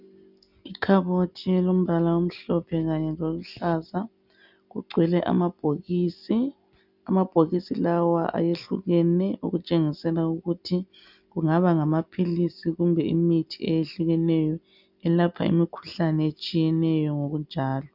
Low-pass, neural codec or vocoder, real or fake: 5.4 kHz; none; real